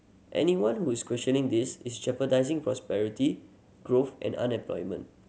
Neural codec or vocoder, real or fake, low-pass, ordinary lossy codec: none; real; none; none